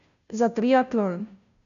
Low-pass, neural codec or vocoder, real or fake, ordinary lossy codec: 7.2 kHz; codec, 16 kHz, 0.5 kbps, FunCodec, trained on Chinese and English, 25 frames a second; fake; none